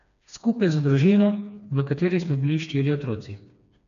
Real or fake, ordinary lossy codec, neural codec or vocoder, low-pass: fake; none; codec, 16 kHz, 2 kbps, FreqCodec, smaller model; 7.2 kHz